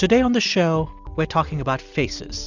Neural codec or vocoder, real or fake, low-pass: none; real; 7.2 kHz